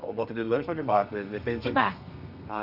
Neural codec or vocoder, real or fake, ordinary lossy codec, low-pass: codec, 32 kHz, 1.9 kbps, SNAC; fake; none; 5.4 kHz